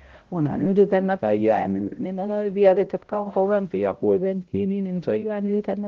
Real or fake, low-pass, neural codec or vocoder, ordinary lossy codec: fake; 7.2 kHz; codec, 16 kHz, 0.5 kbps, X-Codec, HuBERT features, trained on balanced general audio; Opus, 16 kbps